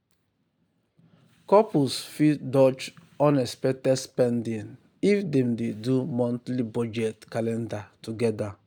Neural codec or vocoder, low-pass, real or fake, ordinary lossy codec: none; none; real; none